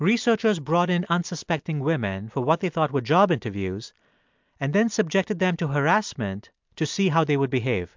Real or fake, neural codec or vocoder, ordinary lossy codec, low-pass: real; none; MP3, 64 kbps; 7.2 kHz